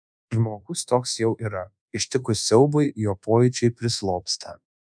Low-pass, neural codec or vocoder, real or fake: 9.9 kHz; codec, 24 kHz, 1.2 kbps, DualCodec; fake